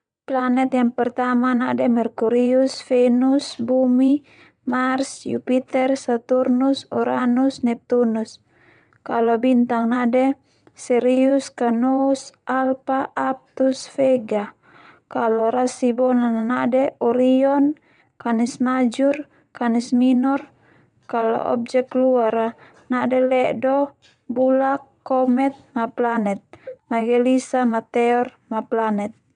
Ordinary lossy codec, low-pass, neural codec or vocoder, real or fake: none; 9.9 kHz; vocoder, 22.05 kHz, 80 mel bands, WaveNeXt; fake